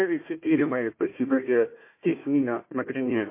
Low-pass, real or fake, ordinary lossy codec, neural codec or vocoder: 3.6 kHz; fake; MP3, 24 kbps; codec, 16 kHz, 1 kbps, FunCodec, trained on Chinese and English, 50 frames a second